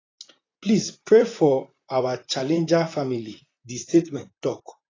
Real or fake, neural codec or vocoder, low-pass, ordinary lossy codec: fake; vocoder, 44.1 kHz, 128 mel bands, Pupu-Vocoder; 7.2 kHz; AAC, 32 kbps